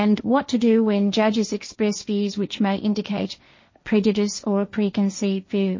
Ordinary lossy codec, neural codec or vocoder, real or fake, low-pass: MP3, 32 kbps; codec, 16 kHz, 1.1 kbps, Voila-Tokenizer; fake; 7.2 kHz